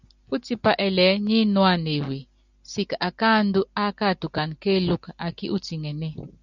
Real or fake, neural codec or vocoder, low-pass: real; none; 7.2 kHz